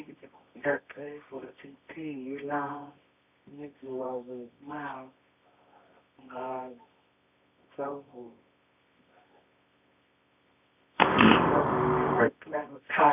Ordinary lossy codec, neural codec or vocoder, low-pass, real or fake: none; codec, 24 kHz, 0.9 kbps, WavTokenizer, medium music audio release; 3.6 kHz; fake